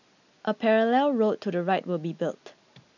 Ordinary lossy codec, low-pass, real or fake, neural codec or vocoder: none; 7.2 kHz; real; none